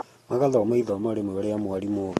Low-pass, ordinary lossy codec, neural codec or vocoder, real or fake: 19.8 kHz; AAC, 32 kbps; none; real